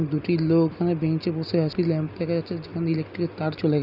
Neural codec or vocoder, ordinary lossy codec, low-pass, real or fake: none; none; 5.4 kHz; real